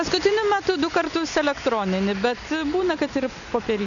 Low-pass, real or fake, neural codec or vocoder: 7.2 kHz; real; none